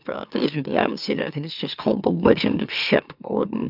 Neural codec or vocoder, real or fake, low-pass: autoencoder, 44.1 kHz, a latent of 192 numbers a frame, MeloTTS; fake; 5.4 kHz